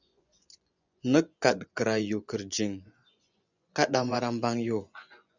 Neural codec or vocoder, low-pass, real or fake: vocoder, 24 kHz, 100 mel bands, Vocos; 7.2 kHz; fake